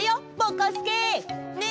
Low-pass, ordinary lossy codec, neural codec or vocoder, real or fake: none; none; none; real